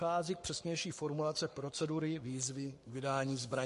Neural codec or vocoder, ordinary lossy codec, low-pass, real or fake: codec, 44.1 kHz, 7.8 kbps, Pupu-Codec; MP3, 48 kbps; 14.4 kHz; fake